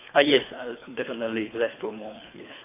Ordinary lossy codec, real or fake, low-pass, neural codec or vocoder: AAC, 24 kbps; fake; 3.6 kHz; codec, 24 kHz, 3 kbps, HILCodec